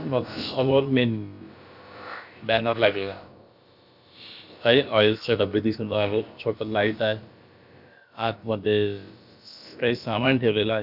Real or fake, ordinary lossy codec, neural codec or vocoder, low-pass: fake; none; codec, 16 kHz, about 1 kbps, DyCAST, with the encoder's durations; 5.4 kHz